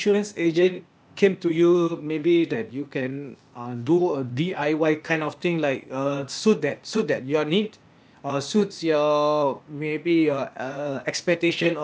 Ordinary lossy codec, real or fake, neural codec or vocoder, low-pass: none; fake; codec, 16 kHz, 0.8 kbps, ZipCodec; none